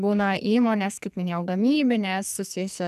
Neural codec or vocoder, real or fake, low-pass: codec, 44.1 kHz, 2.6 kbps, SNAC; fake; 14.4 kHz